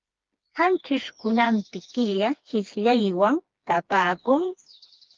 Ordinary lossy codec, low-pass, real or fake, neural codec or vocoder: Opus, 32 kbps; 7.2 kHz; fake; codec, 16 kHz, 2 kbps, FreqCodec, smaller model